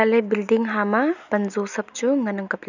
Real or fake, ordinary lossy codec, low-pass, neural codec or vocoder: real; none; 7.2 kHz; none